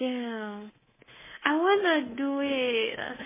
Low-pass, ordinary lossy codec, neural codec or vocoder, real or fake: 3.6 kHz; MP3, 16 kbps; codec, 24 kHz, 3.1 kbps, DualCodec; fake